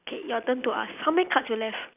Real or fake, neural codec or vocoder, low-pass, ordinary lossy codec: real; none; 3.6 kHz; none